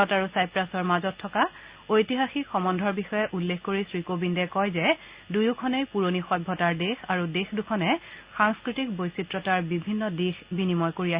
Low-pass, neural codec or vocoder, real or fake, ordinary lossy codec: 3.6 kHz; none; real; Opus, 64 kbps